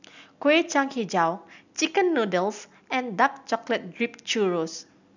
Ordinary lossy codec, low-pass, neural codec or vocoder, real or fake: none; 7.2 kHz; none; real